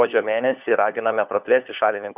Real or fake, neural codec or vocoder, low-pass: fake; codec, 16 kHz, 4 kbps, FunCodec, trained on LibriTTS, 50 frames a second; 3.6 kHz